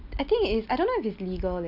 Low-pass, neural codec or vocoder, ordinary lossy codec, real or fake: 5.4 kHz; none; Opus, 64 kbps; real